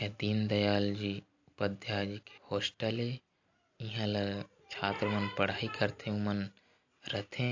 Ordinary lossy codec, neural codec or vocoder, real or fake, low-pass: AAC, 48 kbps; none; real; 7.2 kHz